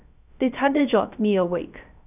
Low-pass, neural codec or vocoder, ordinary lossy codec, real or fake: 3.6 kHz; codec, 16 kHz, 0.3 kbps, FocalCodec; none; fake